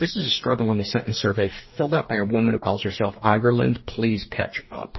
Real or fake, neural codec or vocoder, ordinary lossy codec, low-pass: fake; codec, 44.1 kHz, 2.6 kbps, DAC; MP3, 24 kbps; 7.2 kHz